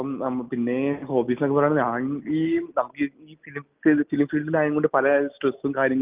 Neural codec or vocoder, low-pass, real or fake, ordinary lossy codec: none; 3.6 kHz; real; Opus, 24 kbps